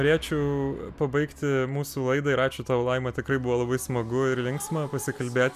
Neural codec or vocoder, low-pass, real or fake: none; 14.4 kHz; real